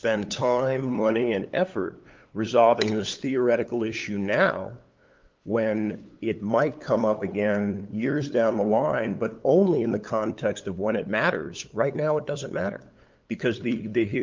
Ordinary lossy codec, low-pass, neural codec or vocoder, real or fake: Opus, 24 kbps; 7.2 kHz; codec, 16 kHz, 8 kbps, FunCodec, trained on LibriTTS, 25 frames a second; fake